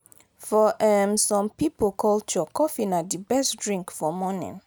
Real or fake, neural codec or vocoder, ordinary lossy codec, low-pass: real; none; none; none